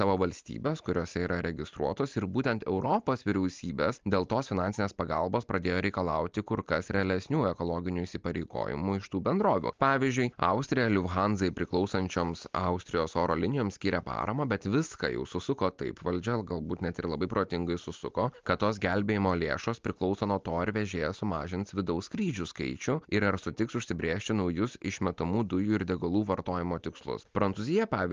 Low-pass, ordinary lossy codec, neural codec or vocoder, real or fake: 7.2 kHz; Opus, 24 kbps; none; real